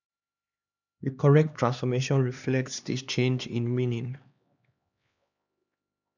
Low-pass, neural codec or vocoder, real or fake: 7.2 kHz; codec, 16 kHz, 2 kbps, X-Codec, HuBERT features, trained on LibriSpeech; fake